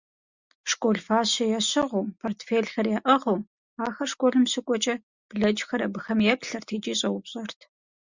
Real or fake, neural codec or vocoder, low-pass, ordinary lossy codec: real; none; 7.2 kHz; Opus, 64 kbps